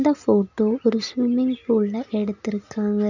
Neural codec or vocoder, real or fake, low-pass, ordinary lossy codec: none; real; 7.2 kHz; none